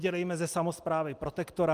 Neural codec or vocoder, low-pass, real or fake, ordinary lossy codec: none; 14.4 kHz; real; Opus, 32 kbps